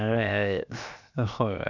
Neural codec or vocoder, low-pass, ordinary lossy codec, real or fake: codec, 16 kHz, 0.7 kbps, FocalCodec; 7.2 kHz; none; fake